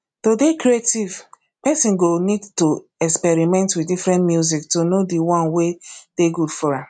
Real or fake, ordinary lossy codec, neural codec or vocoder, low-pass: real; none; none; 9.9 kHz